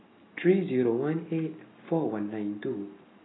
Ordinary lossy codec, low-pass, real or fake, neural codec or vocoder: AAC, 16 kbps; 7.2 kHz; real; none